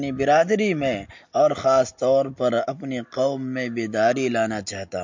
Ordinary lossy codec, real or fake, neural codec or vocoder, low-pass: MP3, 48 kbps; real; none; 7.2 kHz